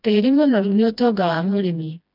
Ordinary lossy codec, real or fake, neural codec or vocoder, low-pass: none; fake; codec, 16 kHz, 1 kbps, FreqCodec, smaller model; 5.4 kHz